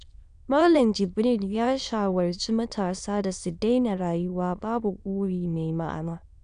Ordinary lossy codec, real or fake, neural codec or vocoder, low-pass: none; fake; autoencoder, 22.05 kHz, a latent of 192 numbers a frame, VITS, trained on many speakers; 9.9 kHz